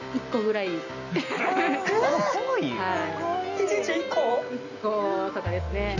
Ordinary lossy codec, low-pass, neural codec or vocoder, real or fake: none; 7.2 kHz; none; real